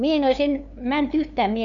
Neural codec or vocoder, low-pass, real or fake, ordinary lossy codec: codec, 16 kHz, 2 kbps, FunCodec, trained on LibriTTS, 25 frames a second; 7.2 kHz; fake; MP3, 64 kbps